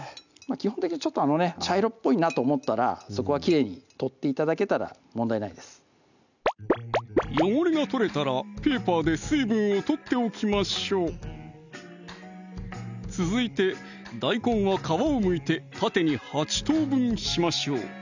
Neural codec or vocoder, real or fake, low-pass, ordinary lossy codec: none; real; 7.2 kHz; none